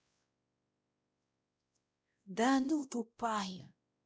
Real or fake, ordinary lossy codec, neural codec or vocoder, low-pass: fake; none; codec, 16 kHz, 0.5 kbps, X-Codec, WavLM features, trained on Multilingual LibriSpeech; none